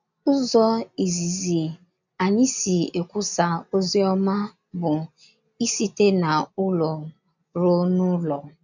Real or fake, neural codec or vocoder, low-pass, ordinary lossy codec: real; none; 7.2 kHz; none